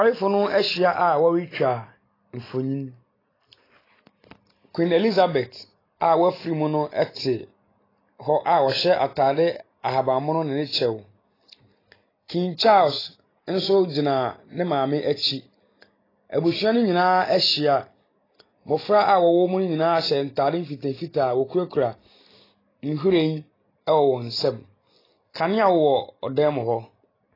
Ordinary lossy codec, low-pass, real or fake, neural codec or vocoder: AAC, 24 kbps; 5.4 kHz; real; none